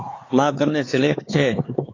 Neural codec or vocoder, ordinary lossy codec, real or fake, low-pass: codec, 16 kHz, 4 kbps, X-Codec, HuBERT features, trained on LibriSpeech; AAC, 32 kbps; fake; 7.2 kHz